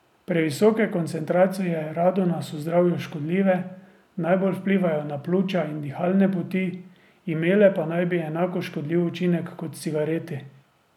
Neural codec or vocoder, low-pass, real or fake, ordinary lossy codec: none; 19.8 kHz; real; none